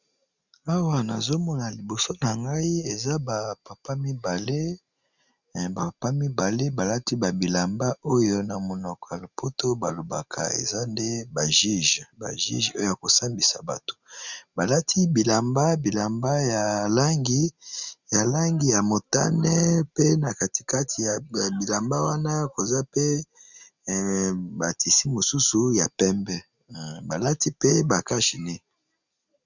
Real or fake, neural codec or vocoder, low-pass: real; none; 7.2 kHz